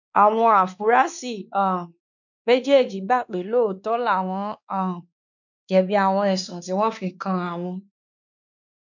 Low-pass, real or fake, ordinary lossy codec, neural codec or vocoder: 7.2 kHz; fake; none; codec, 16 kHz, 2 kbps, X-Codec, WavLM features, trained on Multilingual LibriSpeech